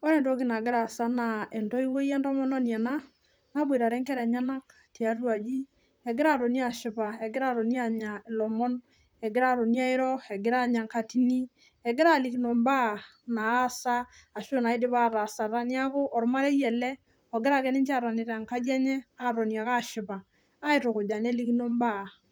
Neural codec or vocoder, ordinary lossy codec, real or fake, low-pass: vocoder, 44.1 kHz, 128 mel bands, Pupu-Vocoder; none; fake; none